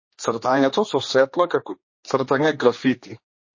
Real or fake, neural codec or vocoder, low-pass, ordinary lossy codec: fake; codec, 16 kHz, 2 kbps, X-Codec, HuBERT features, trained on general audio; 7.2 kHz; MP3, 32 kbps